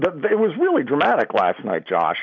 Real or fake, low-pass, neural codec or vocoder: real; 7.2 kHz; none